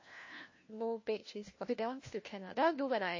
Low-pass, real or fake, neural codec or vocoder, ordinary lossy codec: 7.2 kHz; fake; codec, 16 kHz, 1 kbps, FunCodec, trained on LibriTTS, 50 frames a second; MP3, 32 kbps